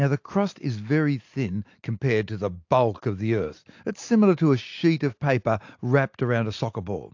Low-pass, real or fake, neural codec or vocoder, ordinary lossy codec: 7.2 kHz; real; none; AAC, 48 kbps